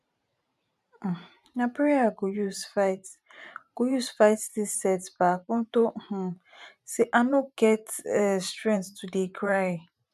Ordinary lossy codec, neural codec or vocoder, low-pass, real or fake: none; vocoder, 44.1 kHz, 128 mel bands every 512 samples, BigVGAN v2; 14.4 kHz; fake